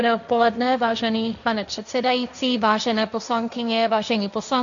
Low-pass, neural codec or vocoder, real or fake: 7.2 kHz; codec, 16 kHz, 1.1 kbps, Voila-Tokenizer; fake